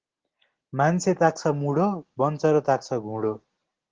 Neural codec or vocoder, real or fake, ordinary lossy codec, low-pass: none; real; Opus, 16 kbps; 7.2 kHz